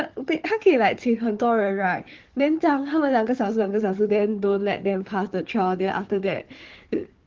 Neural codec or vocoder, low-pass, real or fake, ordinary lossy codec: codec, 16 kHz, 4 kbps, FunCodec, trained on Chinese and English, 50 frames a second; 7.2 kHz; fake; Opus, 16 kbps